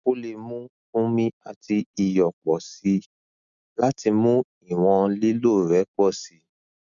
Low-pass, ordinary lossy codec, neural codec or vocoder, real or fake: 7.2 kHz; none; none; real